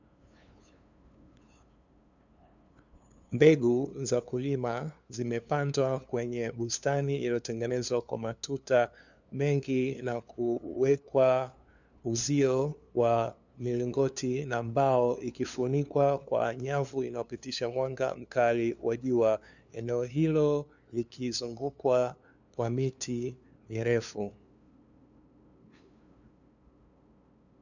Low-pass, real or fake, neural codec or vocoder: 7.2 kHz; fake; codec, 16 kHz, 2 kbps, FunCodec, trained on LibriTTS, 25 frames a second